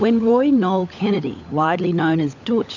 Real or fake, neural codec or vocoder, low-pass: fake; codec, 16 kHz, 16 kbps, FunCodec, trained on LibriTTS, 50 frames a second; 7.2 kHz